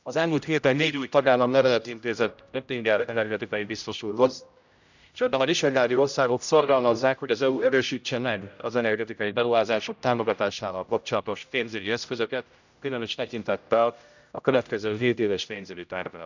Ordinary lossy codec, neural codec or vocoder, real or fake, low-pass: none; codec, 16 kHz, 0.5 kbps, X-Codec, HuBERT features, trained on general audio; fake; 7.2 kHz